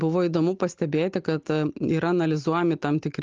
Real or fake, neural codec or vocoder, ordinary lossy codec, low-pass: real; none; Opus, 32 kbps; 7.2 kHz